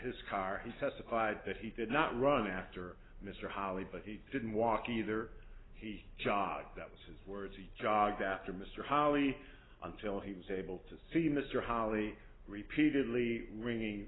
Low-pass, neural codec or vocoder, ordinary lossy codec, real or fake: 7.2 kHz; none; AAC, 16 kbps; real